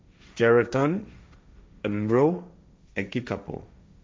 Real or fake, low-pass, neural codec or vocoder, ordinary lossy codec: fake; none; codec, 16 kHz, 1.1 kbps, Voila-Tokenizer; none